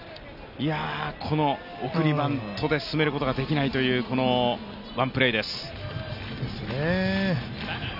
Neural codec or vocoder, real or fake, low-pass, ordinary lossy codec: none; real; 5.4 kHz; none